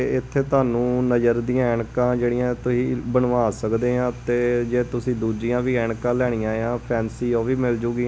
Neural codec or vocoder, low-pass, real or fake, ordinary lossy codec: none; none; real; none